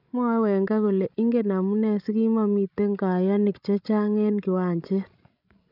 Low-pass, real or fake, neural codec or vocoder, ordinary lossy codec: 5.4 kHz; fake; codec, 16 kHz, 16 kbps, FreqCodec, larger model; none